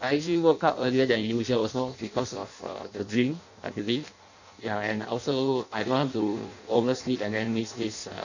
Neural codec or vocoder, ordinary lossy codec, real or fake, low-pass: codec, 16 kHz in and 24 kHz out, 0.6 kbps, FireRedTTS-2 codec; none; fake; 7.2 kHz